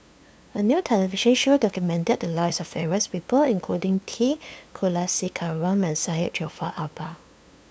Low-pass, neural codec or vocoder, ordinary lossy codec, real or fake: none; codec, 16 kHz, 2 kbps, FunCodec, trained on LibriTTS, 25 frames a second; none; fake